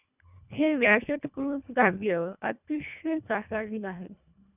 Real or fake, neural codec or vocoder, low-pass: fake; codec, 24 kHz, 1.5 kbps, HILCodec; 3.6 kHz